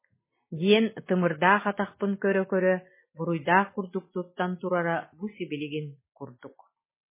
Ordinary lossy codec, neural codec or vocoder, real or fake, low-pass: MP3, 16 kbps; none; real; 3.6 kHz